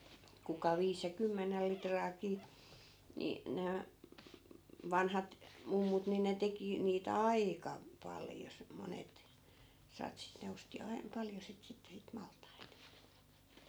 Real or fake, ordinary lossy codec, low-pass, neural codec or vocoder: real; none; none; none